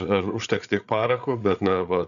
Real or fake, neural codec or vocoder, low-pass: fake; codec, 16 kHz, 8 kbps, FreqCodec, larger model; 7.2 kHz